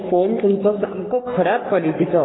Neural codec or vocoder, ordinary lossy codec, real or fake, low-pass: codec, 44.1 kHz, 3.4 kbps, Pupu-Codec; AAC, 16 kbps; fake; 7.2 kHz